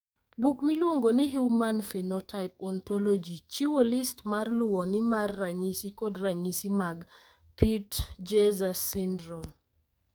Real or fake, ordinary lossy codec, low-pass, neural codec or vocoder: fake; none; none; codec, 44.1 kHz, 2.6 kbps, SNAC